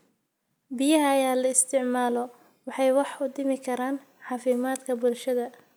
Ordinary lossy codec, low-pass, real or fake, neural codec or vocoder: none; none; real; none